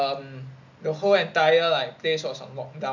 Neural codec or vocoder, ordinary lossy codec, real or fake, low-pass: none; none; real; 7.2 kHz